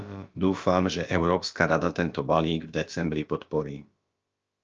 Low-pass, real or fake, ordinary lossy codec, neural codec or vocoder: 7.2 kHz; fake; Opus, 24 kbps; codec, 16 kHz, about 1 kbps, DyCAST, with the encoder's durations